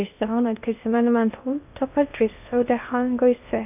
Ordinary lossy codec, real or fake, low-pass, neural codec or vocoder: none; fake; 3.6 kHz; codec, 16 kHz in and 24 kHz out, 0.6 kbps, FocalCodec, streaming, 2048 codes